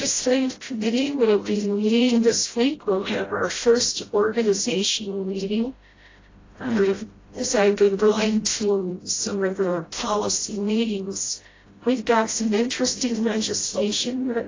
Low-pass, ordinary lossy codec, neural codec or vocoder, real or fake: 7.2 kHz; AAC, 32 kbps; codec, 16 kHz, 0.5 kbps, FreqCodec, smaller model; fake